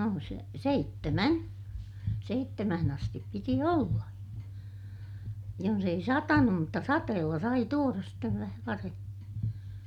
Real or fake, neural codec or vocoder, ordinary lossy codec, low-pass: real; none; none; 19.8 kHz